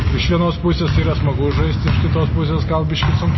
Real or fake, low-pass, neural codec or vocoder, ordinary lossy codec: real; 7.2 kHz; none; MP3, 24 kbps